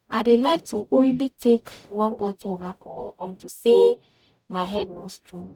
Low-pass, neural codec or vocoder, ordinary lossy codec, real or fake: 19.8 kHz; codec, 44.1 kHz, 0.9 kbps, DAC; none; fake